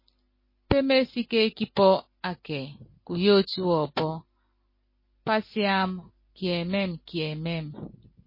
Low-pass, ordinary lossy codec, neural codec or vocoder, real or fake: 5.4 kHz; MP3, 24 kbps; none; real